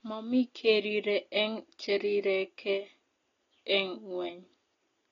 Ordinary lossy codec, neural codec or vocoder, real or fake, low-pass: AAC, 32 kbps; none; real; 7.2 kHz